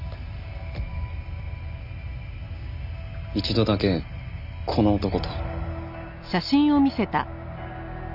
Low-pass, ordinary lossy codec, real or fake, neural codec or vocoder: 5.4 kHz; none; real; none